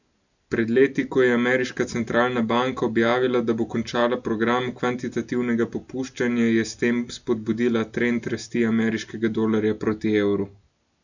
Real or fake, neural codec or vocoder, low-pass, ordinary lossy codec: real; none; 7.2 kHz; none